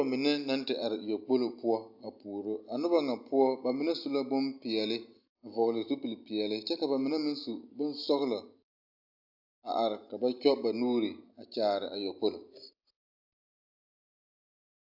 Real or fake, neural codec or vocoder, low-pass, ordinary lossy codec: real; none; 5.4 kHz; AAC, 48 kbps